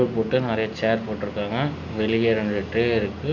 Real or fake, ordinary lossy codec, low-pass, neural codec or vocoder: real; none; 7.2 kHz; none